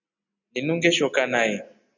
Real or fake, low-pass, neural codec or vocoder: real; 7.2 kHz; none